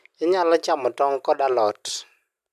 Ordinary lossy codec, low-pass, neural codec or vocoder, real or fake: MP3, 96 kbps; 14.4 kHz; none; real